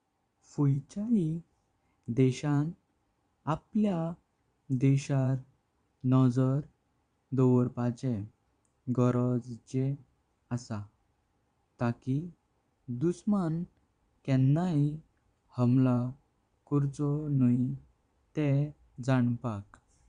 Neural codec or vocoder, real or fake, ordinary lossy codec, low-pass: vocoder, 22.05 kHz, 80 mel bands, Vocos; fake; Opus, 64 kbps; 9.9 kHz